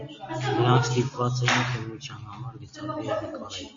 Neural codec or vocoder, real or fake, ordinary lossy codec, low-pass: none; real; MP3, 48 kbps; 7.2 kHz